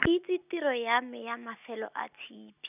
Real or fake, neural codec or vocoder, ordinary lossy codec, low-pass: real; none; none; 3.6 kHz